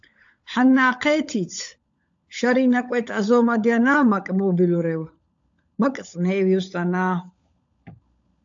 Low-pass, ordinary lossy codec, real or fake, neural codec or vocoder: 7.2 kHz; AAC, 64 kbps; fake; codec, 16 kHz, 16 kbps, FunCodec, trained on LibriTTS, 50 frames a second